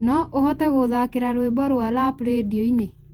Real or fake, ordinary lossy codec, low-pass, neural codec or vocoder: fake; Opus, 24 kbps; 19.8 kHz; vocoder, 48 kHz, 128 mel bands, Vocos